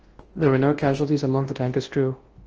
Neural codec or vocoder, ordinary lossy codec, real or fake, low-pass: codec, 24 kHz, 0.9 kbps, WavTokenizer, large speech release; Opus, 16 kbps; fake; 7.2 kHz